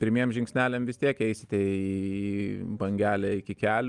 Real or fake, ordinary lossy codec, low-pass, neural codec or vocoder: real; Opus, 32 kbps; 10.8 kHz; none